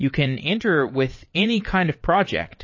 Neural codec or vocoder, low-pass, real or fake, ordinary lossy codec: vocoder, 22.05 kHz, 80 mel bands, WaveNeXt; 7.2 kHz; fake; MP3, 32 kbps